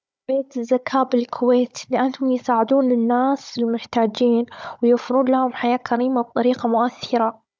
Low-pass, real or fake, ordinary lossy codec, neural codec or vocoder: none; fake; none; codec, 16 kHz, 16 kbps, FunCodec, trained on Chinese and English, 50 frames a second